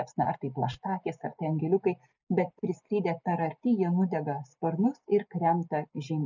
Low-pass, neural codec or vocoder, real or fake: 7.2 kHz; none; real